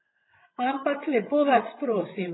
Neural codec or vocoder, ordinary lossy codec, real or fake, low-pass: codec, 16 kHz, 16 kbps, FreqCodec, larger model; AAC, 16 kbps; fake; 7.2 kHz